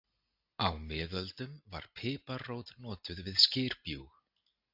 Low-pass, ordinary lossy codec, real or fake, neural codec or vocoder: 5.4 kHz; AAC, 48 kbps; real; none